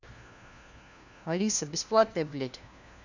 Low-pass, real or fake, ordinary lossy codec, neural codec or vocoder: 7.2 kHz; fake; none; codec, 16 kHz, 1 kbps, FunCodec, trained on LibriTTS, 50 frames a second